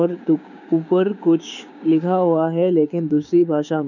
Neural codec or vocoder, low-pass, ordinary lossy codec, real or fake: codec, 16 kHz, 6 kbps, DAC; 7.2 kHz; none; fake